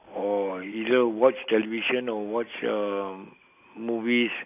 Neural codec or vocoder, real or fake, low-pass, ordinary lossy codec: none; real; 3.6 kHz; none